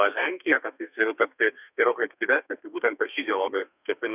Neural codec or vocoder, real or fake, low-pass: codec, 32 kHz, 1.9 kbps, SNAC; fake; 3.6 kHz